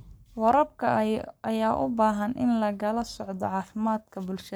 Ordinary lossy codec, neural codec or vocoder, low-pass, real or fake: none; codec, 44.1 kHz, 7.8 kbps, Pupu-Codec; none; fake